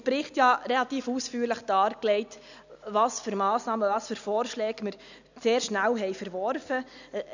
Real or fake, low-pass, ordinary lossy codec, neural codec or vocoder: real; 7.2 kHz; none; none